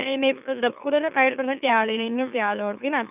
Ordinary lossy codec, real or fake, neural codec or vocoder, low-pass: none; fake; autoencoder, 44.1 kHz, a latent of 192 numbers a frame, MeloTTS; 3.6 kHz